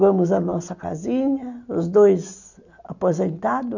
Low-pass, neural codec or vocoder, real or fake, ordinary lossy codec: 7.2 kHz; none; real; none